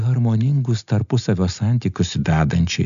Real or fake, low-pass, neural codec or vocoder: real; 7.2 kHz; none